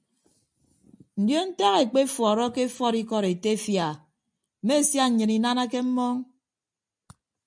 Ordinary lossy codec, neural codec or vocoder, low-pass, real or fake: MP3, 96 kbps; none; 9.9 kHz; real